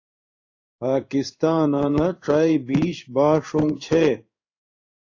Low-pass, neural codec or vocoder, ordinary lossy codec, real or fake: 7.2 kHz; codec, 16 kHz in and 24 kHz out, 1 kbps, XY-Tokenizer; AAC, 48 kbps; fake